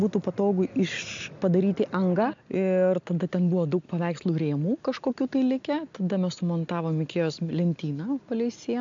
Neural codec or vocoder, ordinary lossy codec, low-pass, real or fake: none; MP3, 64 kbps; 7.2 kHz; real